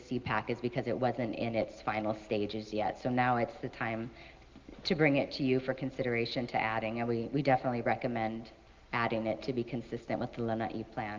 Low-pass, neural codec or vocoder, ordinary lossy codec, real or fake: 7.2 kHz; none; Opus, 24 kbps; real